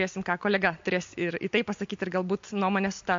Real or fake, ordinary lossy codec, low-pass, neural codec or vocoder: real; MP3, 48 kbps; 7.2 kHz; none